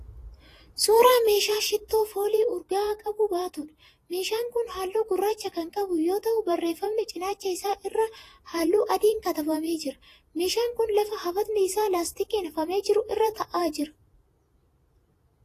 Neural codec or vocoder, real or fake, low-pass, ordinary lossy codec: vocoder, 48 kHz, 128 mel bands, Vocos; fake; 14.4 kHz; AAC, 48 kbps